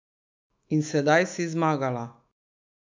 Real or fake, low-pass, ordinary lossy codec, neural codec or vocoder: fake; 7.2 kHz; MP3, 48 kbps; autoencoder, 48 kHz, 128 numbers a frame, DAC-VAE, trained on Japanese speech